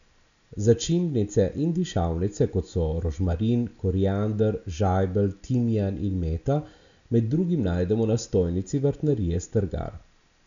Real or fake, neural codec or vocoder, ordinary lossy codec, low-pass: real; none; none; 7.2 kHz